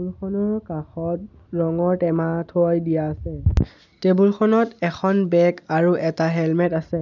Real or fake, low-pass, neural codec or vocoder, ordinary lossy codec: real; 7.2 kHz; none; none